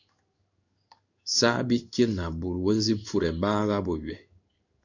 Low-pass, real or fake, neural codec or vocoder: 7.2 kHz; fake; codec, 16 kHz in and 24 kHz out, 1 kbps, XY-Tokenizer